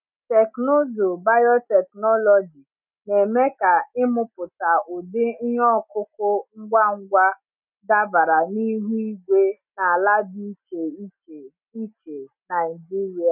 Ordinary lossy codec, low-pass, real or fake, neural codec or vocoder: none; 3.6 kHz; real; none